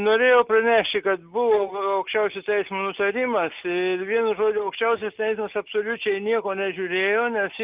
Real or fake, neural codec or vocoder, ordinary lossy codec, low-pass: real; none; Opus, 24 kbps; 3.6 kHz